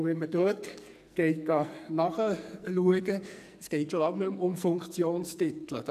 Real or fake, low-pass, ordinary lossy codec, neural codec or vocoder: fake; 14.4 kHz; none; codec, 44.1 kHz, 2.6 kbps, SNAC